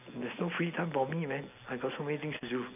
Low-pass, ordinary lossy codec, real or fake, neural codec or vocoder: 3.6 kHz; none; real; none